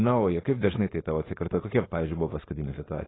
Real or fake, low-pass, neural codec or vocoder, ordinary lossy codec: fake; 7.2 kHz; codec, 16 kHz, 4.8 kbps, FACodec; AAC, 16 kbps